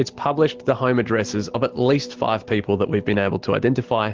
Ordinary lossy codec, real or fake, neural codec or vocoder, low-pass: Opus, 16 kbps; real; none; 7.2 kHz